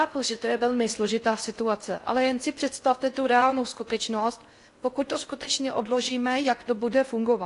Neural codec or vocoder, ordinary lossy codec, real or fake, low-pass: codec, 16 kHz in and 24 kHz out, 0.6 kbps, FocalCodec, streaming, 4096 codes; AAC, 48 kbps; fake; 10.8 kHz